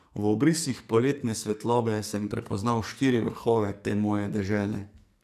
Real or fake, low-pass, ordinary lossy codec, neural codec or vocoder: fake; 14.4 kHz; none; codec, 44.1 kHz, 2.6 kbps, SNAC